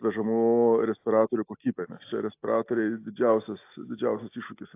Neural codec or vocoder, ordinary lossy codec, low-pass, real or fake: none; AAC, 24 kbps; 3.6 kHz; real